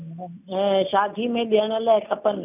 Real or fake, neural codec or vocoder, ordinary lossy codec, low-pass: real; none; none; 3.6 kHz